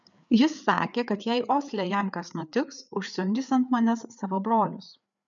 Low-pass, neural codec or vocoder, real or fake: 7.2 kHz; codec, 16 kHz, 4 kbps, FreqCodec, larger model; fake